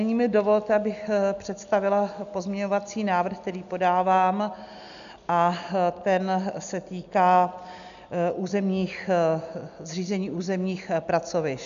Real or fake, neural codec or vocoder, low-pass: real; none; 7.2 kHz